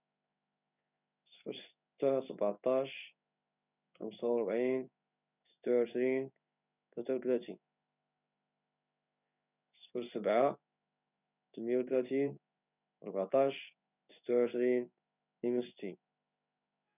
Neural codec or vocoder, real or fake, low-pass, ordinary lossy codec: codec, 16 kHz in and 24 kHz out, 1 kbps, XY-Tokenizer; fake; 3.6 kHz; none